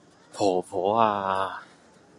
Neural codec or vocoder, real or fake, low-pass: none; real; 10.8 kHz